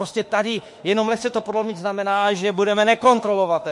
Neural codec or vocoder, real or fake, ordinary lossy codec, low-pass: autoencoder, 48 kHz, 32 numbers a frame, DAC-VAE, trained on Japanese speech; fake; MP3, 48 kbps; 10.8 kHz